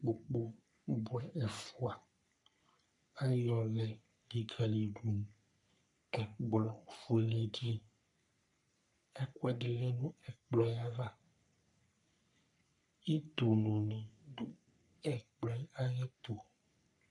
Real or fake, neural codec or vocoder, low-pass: fake; codec, 44.1 kHz, 3.4 kbps, Pupu-Codec; 10.8 kHz